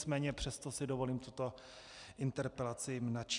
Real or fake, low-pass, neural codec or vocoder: real; 10.8 kHz; none